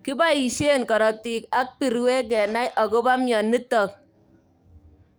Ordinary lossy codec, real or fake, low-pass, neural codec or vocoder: none; fake; none; codec, 44.1 kHz, 7.8 kbps, DAC